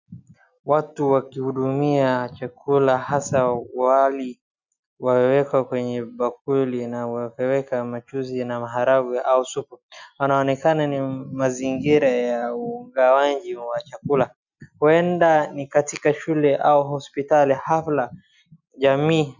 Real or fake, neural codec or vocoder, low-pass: real; none; 7.2 kHz